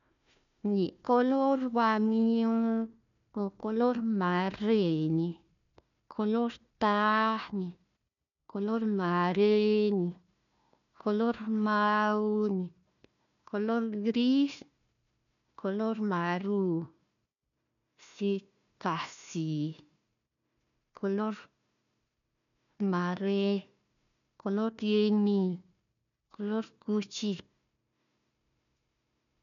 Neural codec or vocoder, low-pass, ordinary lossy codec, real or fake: codec, 16 kHz, 1 kbps, FunCodec, trained on Chinese and English, 50 frames a second; 7.2 kHz; none; fake